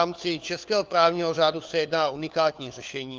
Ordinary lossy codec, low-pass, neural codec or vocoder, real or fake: Opus, 24 kbps; 7.2 kHz; codec, 16 kHz, 4 kbps, FunCodec, trained on LibriTTS, 50 frames a second; fake